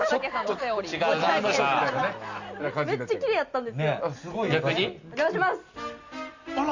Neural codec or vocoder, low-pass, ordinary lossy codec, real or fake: none; 7.2 kHz; Opus, 64 kbps; real